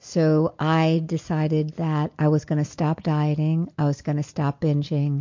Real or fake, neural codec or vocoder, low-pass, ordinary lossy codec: real; none; 7.2 kHz; MP3, 48 kbps